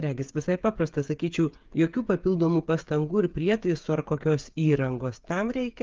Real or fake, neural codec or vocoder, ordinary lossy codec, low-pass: fake; codec, 16 kHz, 8 kbps, FreqCodec, smaller model; Opus, 32 kbps; 7.2 kHz